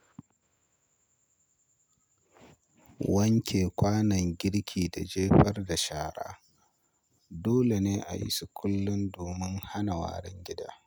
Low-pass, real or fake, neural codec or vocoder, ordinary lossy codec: none; real; none; none